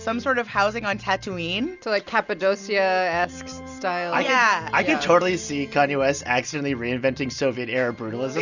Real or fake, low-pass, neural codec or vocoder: real; 7.2 kHz; none